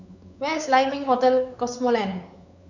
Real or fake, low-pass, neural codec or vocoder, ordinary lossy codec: fake; 7.2 kHz; codec, 16 kHz, 4 kbps, X-Codec, WavLM features, trained on Multilingual LibriSpeech; none